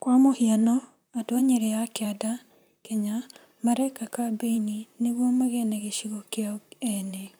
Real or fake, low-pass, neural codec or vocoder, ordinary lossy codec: fake; none; vocoder, 44.1 kHz, 128 mel bands, Pupu-Vocoder; none